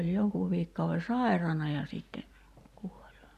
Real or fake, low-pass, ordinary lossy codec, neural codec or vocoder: real; 14.4 kHz; none; none